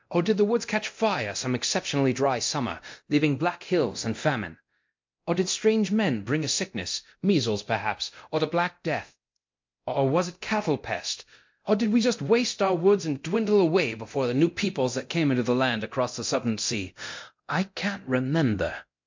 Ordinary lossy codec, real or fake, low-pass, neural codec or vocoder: MP3, 48 kbps; fake; 7.2 kHz; codec, 24 kHz, 0.9 kbps, DualCodec